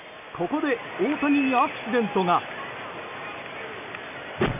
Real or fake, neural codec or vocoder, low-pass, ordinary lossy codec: real; none; 3.6 kHz; none